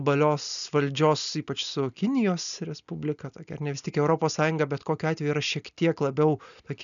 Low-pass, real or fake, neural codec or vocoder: 7.2 kHz; real; none